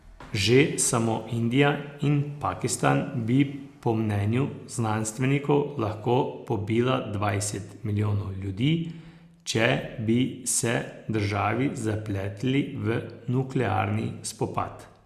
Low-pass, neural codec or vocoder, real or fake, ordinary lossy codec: 14.4 kHz; none; real; Opus, 64 kbps